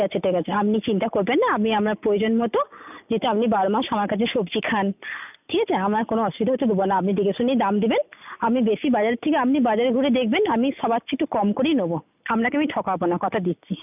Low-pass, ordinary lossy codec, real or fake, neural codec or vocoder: 3.6 kHz; none; real; none